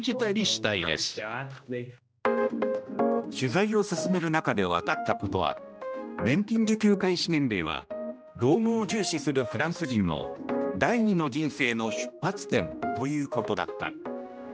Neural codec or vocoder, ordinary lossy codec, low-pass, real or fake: codec, 16 kHz, 1 kbps, X-Codec, HuBERT features, trained on general audio; none; none; fake